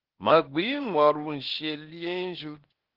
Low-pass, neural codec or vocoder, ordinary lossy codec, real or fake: 5.4 kHz; codec, 16 kHz, 0.8 kbps, ZipCodec; Opus, 16 kbps; fake